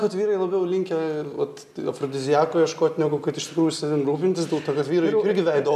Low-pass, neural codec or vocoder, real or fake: 14.4 kHz; none; real